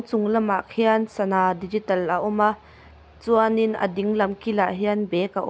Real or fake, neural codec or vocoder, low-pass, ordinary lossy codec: real; none; none; none